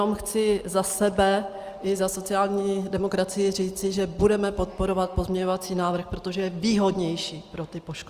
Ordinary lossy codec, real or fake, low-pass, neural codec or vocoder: Opus, 32 kbps; real; 14.4 kHz; none